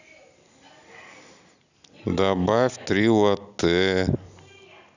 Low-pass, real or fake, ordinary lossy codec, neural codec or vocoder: 7.2 kHz; real; none; none